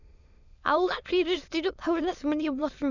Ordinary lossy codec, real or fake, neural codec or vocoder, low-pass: none; fake; autoencoder, 22.05 kHz, a latent of 192 numbers a frame, VITS, trained on many speakers; 7.2 kHz